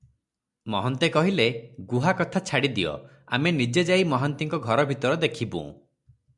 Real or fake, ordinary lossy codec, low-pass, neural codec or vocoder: real; MP3, 96 kbps; 10.8 kHz; none